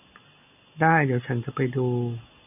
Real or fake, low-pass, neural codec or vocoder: real; 3.6 kHz; none